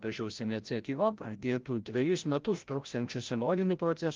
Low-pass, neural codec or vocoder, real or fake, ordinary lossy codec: 7.2 kHz; codec, 16 kHz, 0.5 kbps, FreqCodec, larger model; fake; Opus, 32 kbps